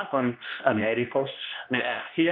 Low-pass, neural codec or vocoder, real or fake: 5.4 kHz; codec, 24 kHz, 0.9 kbps, WavTokenizer, medium speech release version 2; fake